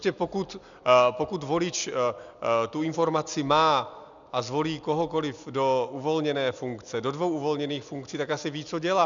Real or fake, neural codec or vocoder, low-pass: real; none; 7.2 kHz